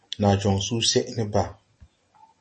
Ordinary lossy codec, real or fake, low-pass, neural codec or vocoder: MP3, 32 kbps; real; 10.8 kHz; none